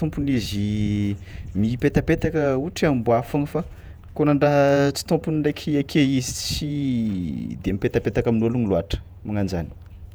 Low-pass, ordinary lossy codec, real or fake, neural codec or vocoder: none; none; fake; vocoder, 48 kHz, 128 mel bands, Vocos